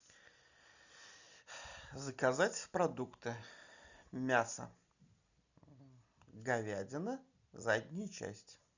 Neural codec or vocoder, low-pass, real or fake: none; 7.2 kHz; real